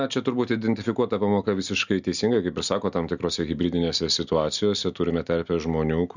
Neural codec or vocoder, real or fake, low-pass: none; real; 7.2 kHz